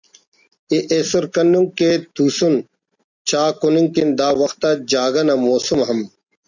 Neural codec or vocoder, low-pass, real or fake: none; 7.2 kHz; real